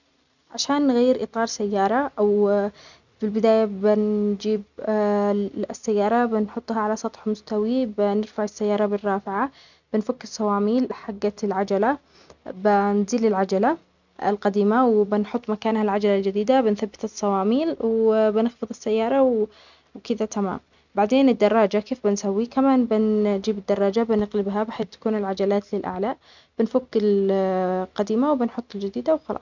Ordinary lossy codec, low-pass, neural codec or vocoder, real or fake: none; 7.2 kHz; none; real